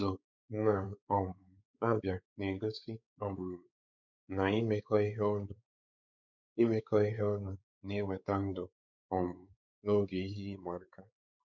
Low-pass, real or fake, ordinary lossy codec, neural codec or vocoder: 7.2 kHz; fake; none; codec, 16 kHz, 4 kbps, X-Codec, WavLM features, trained on Multilingual LibriSpeech